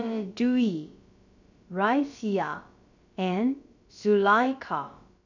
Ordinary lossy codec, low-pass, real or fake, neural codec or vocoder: none; 7.2 kHz; fake; codec, 16 kHz, about 1 kbps, DyCAST, with the encoder's durations